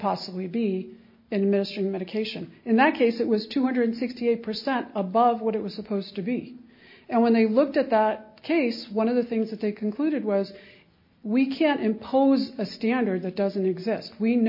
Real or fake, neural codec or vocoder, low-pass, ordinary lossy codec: real; none; 5.4 kHz; MP3, 32 kbps